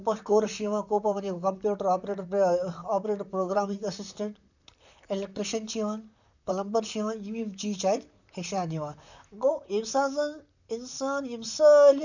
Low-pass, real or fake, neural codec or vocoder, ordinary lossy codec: 7.2 kHz; fake; vocoder, 44.1 kHz, 128 mel bands, Pupu-Vocoder; none